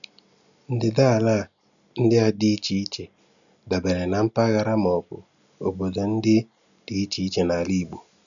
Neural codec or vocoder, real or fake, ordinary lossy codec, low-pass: none; real; none; 7.2 kHz